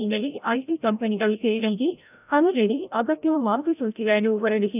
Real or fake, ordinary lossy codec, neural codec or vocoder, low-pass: fake; none; codec, 16 kHz, 0.5 kbps, FreqCodec, larger model; 3.6 kHz